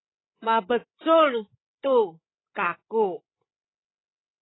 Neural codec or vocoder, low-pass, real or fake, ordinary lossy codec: codec, 16 kHz, 16 kbps, FreqCodec, larger model; 7.2 kHz; fake; AAC, 16 kbps